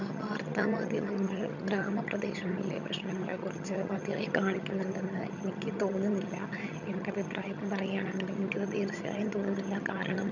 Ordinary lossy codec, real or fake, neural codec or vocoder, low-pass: none; fake; vocoder, 22.05 kHz, 80 mel bands, HiFi-GAN; 7.2 kHz